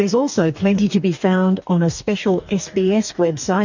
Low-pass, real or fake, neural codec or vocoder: 7.2 kHz; fake; codec, 44.1 kHz, 2.6 kbps, DAC